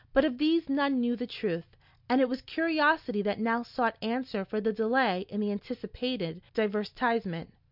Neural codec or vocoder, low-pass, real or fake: none; 5.4 kHz; real